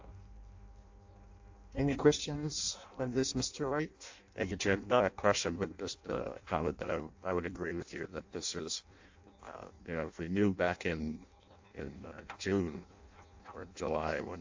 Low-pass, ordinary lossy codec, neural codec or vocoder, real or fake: 7.2 kHz; AAC, 48 kbps; codec, 16 kHz in and 24 kHz out, 0.6 kbps, FireRedTTS-2 codec; fake